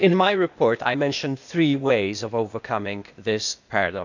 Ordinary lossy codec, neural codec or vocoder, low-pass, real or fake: none; codec, 16 kHz, 0.8 kbps, ZipCodec; 7.2 kHz; fake